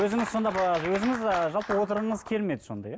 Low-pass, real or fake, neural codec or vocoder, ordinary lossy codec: none; real; none; none